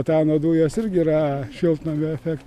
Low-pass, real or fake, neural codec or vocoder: 14.4 kHz; real; none